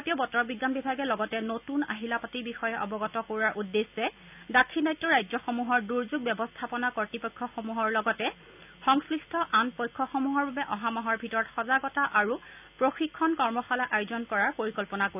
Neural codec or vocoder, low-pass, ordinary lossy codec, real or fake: none; 3.6 kHz; none; real